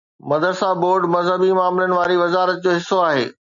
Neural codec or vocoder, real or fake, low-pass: none; real; 7.2 kHz